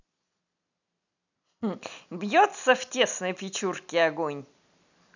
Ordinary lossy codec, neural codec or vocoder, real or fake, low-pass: none; none; real; 7.2 kHz